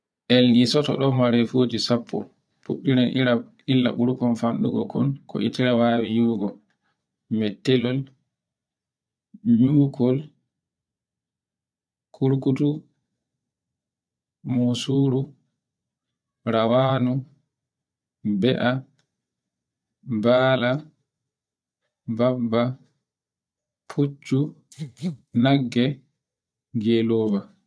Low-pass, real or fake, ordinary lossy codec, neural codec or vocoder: none; fake; none; vocoder, 22.05 kHz, 80 mel bands, Vocos